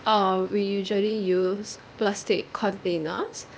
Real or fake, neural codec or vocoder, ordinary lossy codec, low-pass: fake; codec, 16 kHz, 0.8 kbps, ZipCodec; none; none